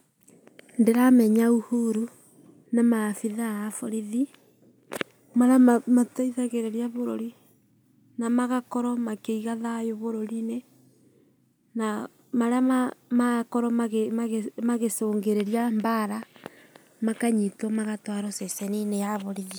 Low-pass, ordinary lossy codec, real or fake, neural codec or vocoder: none; none; real; none